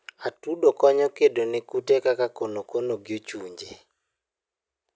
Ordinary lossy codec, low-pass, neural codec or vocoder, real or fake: none; none; none; real